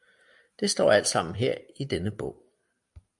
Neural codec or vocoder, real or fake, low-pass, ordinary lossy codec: none; real; 10.8 kHz; AAC, 64 kbps